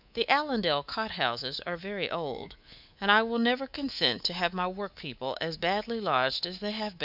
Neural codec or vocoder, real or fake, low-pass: codec, 24 kHz, 3.1 kbps, DualCodec; fake; 5.4 kHz